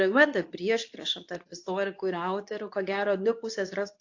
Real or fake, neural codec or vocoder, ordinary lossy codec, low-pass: fake; codec, 24 kHz, 0.9 kbps, WavTokenizer, medium speech release version 2; AAC, 48 kbps; 7.2 kHz